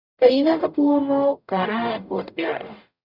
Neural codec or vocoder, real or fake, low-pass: codec, 44.1 kHz, 0.9 kbps, DAC; fake; 5.4 kHz